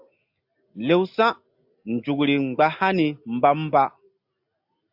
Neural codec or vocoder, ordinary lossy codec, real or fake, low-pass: none; AAC, 48 kbps; real; 5.4 kHz